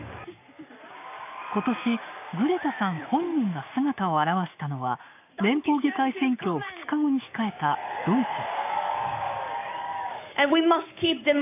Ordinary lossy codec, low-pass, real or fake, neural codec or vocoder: AAC, 32 kbps; 3.6 kHz; fake; codec, 16 kHz, 6 kbps, DAC